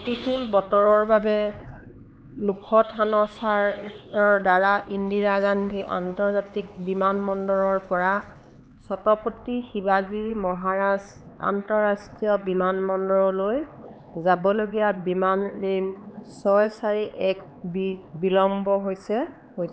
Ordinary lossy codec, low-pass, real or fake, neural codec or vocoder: none; none; fake; codec, 16 kHz, 4 kbps, X-Codec, HuBERT features, trained on LibriSpeech